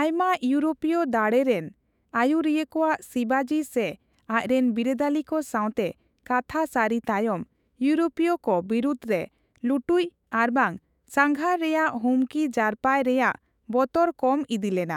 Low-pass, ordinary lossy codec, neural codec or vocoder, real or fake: 19.8 kHz; none; autoencoder, 48 kHz, 128 numbers a frame, DAC-VAE, trained on Japanese speech; fake